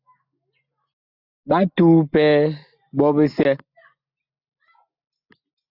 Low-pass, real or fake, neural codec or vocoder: 5.4 kHz; real; none